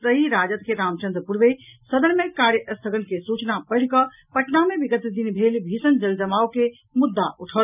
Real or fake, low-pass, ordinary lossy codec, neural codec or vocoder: real; 3.6 kHz; none; none